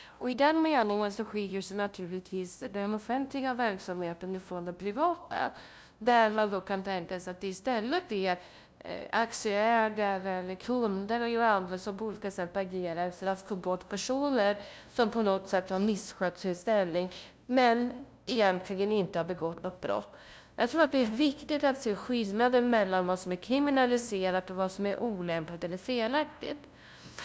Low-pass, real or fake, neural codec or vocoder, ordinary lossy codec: none; fake; codec, 16 kHz, 0.5 kbps, FunCodec, trained on LibriTTS, 25 frames a second; none